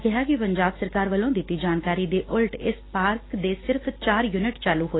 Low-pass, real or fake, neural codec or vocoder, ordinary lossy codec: 7.2 kHz; real; none; AAC, 16 kbps